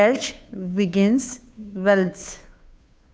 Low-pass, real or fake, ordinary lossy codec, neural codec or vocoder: none; fake; none; codec, 16 kHz, 2 kbps, FunCodec, trained on Chinese and English, 25 frames a second